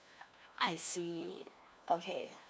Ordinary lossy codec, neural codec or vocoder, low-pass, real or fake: none; codec, 16 kHz, 1 kbps, FunCodec, trained on LibriTTS, 50 frames a second; none; fake